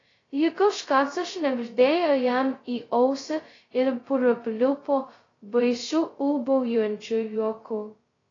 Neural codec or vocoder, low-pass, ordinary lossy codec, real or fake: codec, 16 kHz, 0.2 kbps, FocalCodec; 7.2 kHz; AAC, 32 kbps; fake